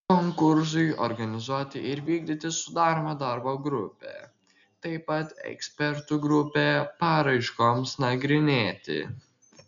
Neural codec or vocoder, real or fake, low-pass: none; real; 7.2 kHz